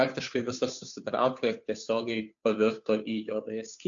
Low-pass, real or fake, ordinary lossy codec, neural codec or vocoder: 7.2 kHz; fake; MP3, 64 kbps; codec, 16 kHz, 2 kbps, FunCodec, trained on Chinese and English, 25 frames a second